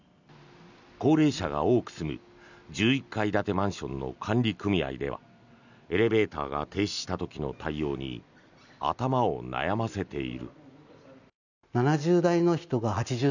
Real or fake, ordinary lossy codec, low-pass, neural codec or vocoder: real; none; 7.2 kHz; none